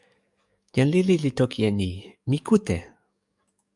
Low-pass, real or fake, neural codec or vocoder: 10.8 kHz; fake; codec, 44.1 kHz, 7.8 kbps, DAC